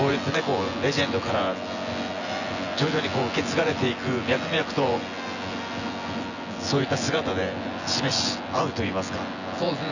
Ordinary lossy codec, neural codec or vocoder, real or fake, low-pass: none; vocoder, 24 kHz, 100 mel bands, Vocos; fake; 7.2 kHz